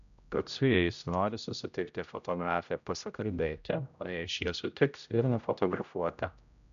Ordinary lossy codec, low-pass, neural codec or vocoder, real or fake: MP3, 96 kbps; 7.2 kHz; codec, 16 kHz, 0.5 kbps, X-Codec, HuBERT features, trained on general audio; fake